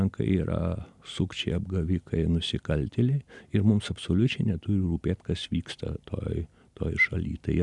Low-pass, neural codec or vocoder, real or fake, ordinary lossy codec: 10.8 kHz; none; real; MP3, 96 kbps